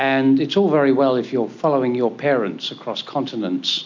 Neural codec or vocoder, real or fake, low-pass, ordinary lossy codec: none; real; 7.2 kHz; MP3, 48 kbps